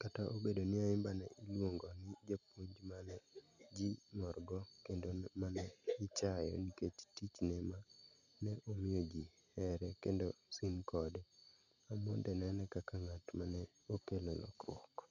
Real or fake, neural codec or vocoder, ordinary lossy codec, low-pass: real; none; none; 7.2 kHz